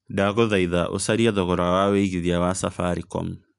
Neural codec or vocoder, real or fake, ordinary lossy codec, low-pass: none; real; none; 10.8 kHz